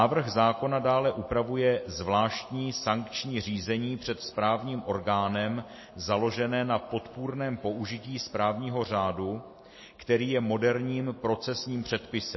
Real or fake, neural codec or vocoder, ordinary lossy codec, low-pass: real; none; MP3, 24 kbps; 7.2 kHz